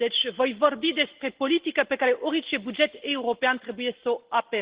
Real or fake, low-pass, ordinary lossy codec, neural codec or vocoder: real; 3.6 kHz; Opus, 16 kbps; none